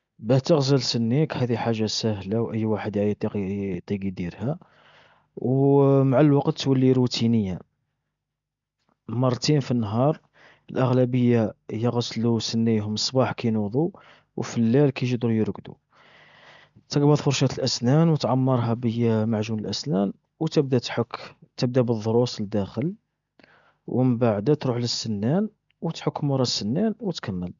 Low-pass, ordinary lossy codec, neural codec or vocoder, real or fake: 7.2 kHz; MP3, 96 kbps; none; real